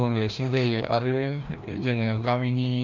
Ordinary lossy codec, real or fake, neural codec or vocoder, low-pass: none; fake; codec, 16 kHz, 1 kbps, FreqCodec, larger model; 7.2 kHz